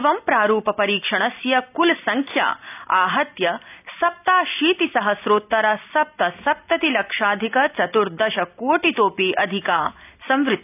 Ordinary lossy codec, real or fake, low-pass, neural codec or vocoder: none; real; 3.6 kHz; none